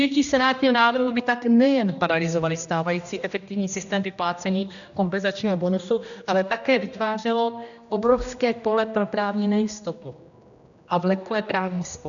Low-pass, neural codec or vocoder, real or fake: 7.2 kHz; codec, 16 kHz, 1 kbps, X-Codec, HuBERT features, trained on general audio; fake